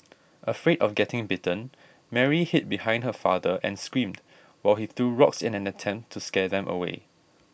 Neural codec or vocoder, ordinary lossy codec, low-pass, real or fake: none; none; none; real